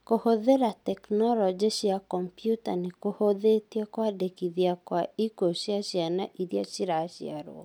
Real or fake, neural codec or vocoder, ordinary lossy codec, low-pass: real; none; none; 19.8 kHz